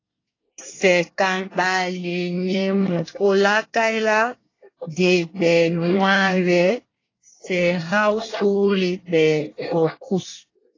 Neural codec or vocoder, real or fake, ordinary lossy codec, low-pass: codec, 24 kHz, 1 kbps, SNAC; fake; AAC, 32 kbps; 7.2 kHz